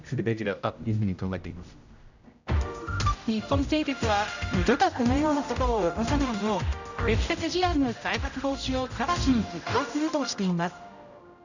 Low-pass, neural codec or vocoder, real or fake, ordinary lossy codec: 7.2 kHz; codec, 16 kHz, 0.5 kbps, X-Codec, HuBERT features, trained on general audio; fake; none